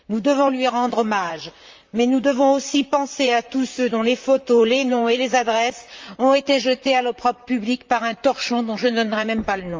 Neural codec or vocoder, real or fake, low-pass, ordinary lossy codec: vocoder, 44.1 kHz, 128 mel bands, Pupu-Vocoder; fake; 7.2 kHz; Opus, 32 kbps